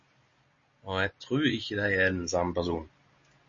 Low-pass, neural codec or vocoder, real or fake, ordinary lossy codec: 7.2 kHz; none; real; MP3, 32 kbps